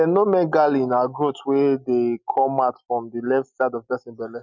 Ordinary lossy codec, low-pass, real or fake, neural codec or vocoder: none; 7.2 kHz; real; none